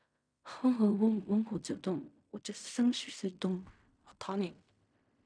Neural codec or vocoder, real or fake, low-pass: codec, 16 kHz in and 24 kHz out, 0.4 kbps, LongCat-Audio-Codec, fine tuned four codebook decoder; fake; 9.9 kHz